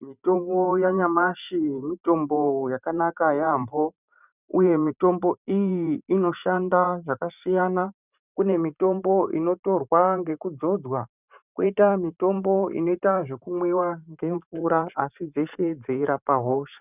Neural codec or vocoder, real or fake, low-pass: vocoder, 44.1 kHz, 128 mel bands every 512 samples, BigVGAN v2; fake; 3.6 kHz